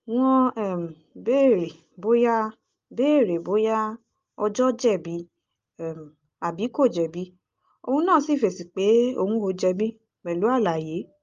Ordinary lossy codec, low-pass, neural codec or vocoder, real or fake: Opus, 32 kbps; 7.2 kHz; none; real